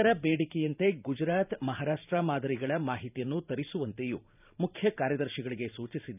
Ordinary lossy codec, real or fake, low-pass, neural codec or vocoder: none; fake; 3.6 kHz; vocoder, 44.1 kHz, 128 mel bands every 512 samples, BigVGAN v2